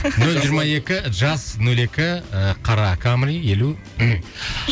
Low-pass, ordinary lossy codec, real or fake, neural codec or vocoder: none; none; real; none